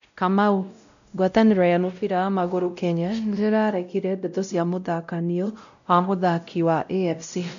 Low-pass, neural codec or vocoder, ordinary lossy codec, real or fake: 7.2 kHz; codec, 16 kHz, 0.5 kbps, X-Codec, WavLM features, trained on Multilingual LibriSpeech; none; fake